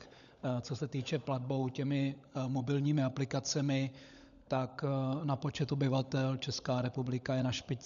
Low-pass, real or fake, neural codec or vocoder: 7.2 kHz; fake; codec, 16 kHz, 16 kbps, FunCodec, trained on LibriTTS, 50 frames a second